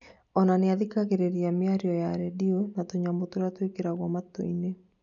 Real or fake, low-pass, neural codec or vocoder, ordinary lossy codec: real; 7.2 kHz; none; none